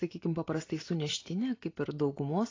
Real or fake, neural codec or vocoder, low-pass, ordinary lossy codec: real; none; 7.2 kHz; AAC, 32 kbps